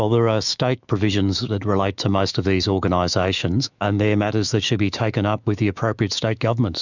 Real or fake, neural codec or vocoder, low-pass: fake; autoencoder, 48 kHz, 128 numbers a frame, DAC-VAE, trained on Japanese speech; 7.2 kHz